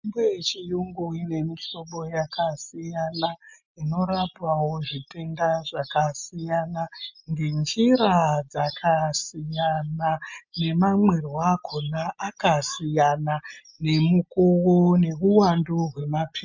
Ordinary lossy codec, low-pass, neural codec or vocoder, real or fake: MP3, 64 kbps; 7.2 kHz; none; real